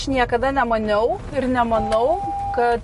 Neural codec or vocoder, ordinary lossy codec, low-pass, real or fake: none; MP3, 48 kbps; 14.4 kHz; real